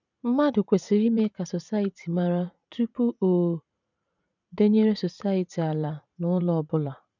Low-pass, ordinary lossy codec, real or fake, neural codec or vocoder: 7.2 kHz; none; fake; vocoder, 44.1 kHz, 80 mel bands, Vocos